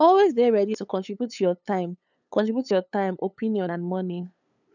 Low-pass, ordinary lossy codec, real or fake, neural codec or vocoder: 7.2 kHz; none; fake; codec, 16 kHz, 8 kbps, FunCodec, trained on LibriTTS, 25 frames a second